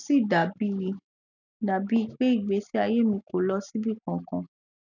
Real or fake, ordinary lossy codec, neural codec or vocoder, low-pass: real; none; none; 7.2 kHz